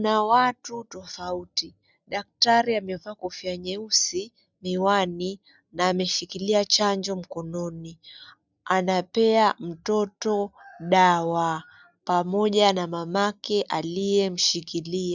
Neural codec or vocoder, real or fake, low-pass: none; real; 7.2 kHz